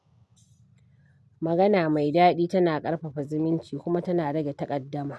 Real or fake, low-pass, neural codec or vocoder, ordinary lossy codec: real; none; none; none